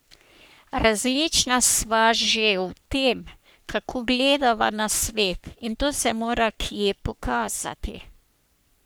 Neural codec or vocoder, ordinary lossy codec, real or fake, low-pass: codec, 44.1 kHz, 3.4 kbps, Pupu-Codec; none; fake; none